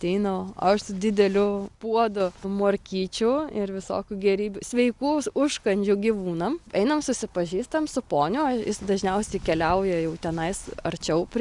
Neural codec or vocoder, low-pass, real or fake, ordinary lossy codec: none; 10.8 kHz; real; Opus, 64 kbps